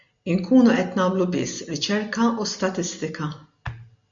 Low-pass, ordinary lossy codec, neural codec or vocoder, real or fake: 7.2 kHz; MP3, 64 kbps; none; real